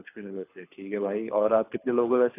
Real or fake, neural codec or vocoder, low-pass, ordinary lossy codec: fake; codec, 24 kHz, 6 kbps, HILCodec; 3.6 kHz; AAC, 24 kbps